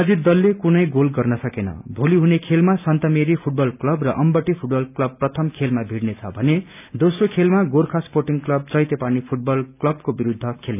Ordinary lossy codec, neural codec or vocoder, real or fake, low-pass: none; none; real; 3.6 kHz